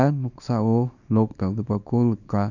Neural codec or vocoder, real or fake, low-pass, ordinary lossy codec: codec, 24 kHz, 0.9 kbps, WavTokenizer, small release; fake; 7.2 kHz; none